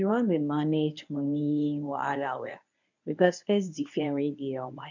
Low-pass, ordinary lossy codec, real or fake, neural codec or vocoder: 7.2 kHz; none; fake; codec, 24 kHz, 0.9 kbps, WavTokenizer, medium speech release version 1